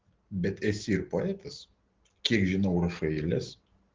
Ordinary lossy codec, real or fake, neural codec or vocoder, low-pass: Opus, 16 kbps; real; none; 7.2 kHz